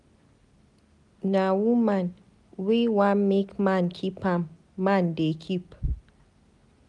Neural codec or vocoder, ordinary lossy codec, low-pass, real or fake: none; none; 10.8 kHz; real